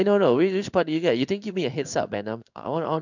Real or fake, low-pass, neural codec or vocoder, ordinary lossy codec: fake; 7.2 kHz; codec, 16 kHz in and 24 kHz out, 1 kbps, XY-Tokenizer; none